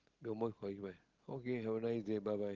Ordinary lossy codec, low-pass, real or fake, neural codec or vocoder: Opus, 16 kbps; 7.2 kHz; real; none